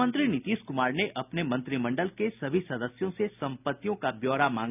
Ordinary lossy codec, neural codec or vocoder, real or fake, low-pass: none; none; real; 3.6 kHz